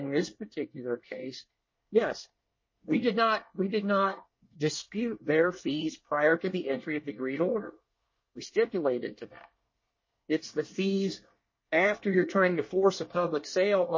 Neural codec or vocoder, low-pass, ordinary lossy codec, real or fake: codec, 24 kHz, 1 kbps, SNAC; 7.2 kHz; MP3, 32 kbps; fake